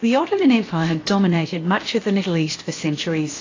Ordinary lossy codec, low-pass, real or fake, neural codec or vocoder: AAC, 32 kbps; 7.2 kHz; fake; codec, 16 kHz, about 1 kbps, DyCAST, with the encoder's durations